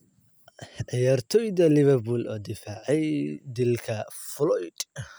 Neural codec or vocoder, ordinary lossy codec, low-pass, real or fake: none; none; none; real